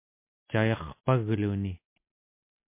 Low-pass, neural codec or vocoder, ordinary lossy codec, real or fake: 3.6 kHz; none; MP3, 24 kbps; real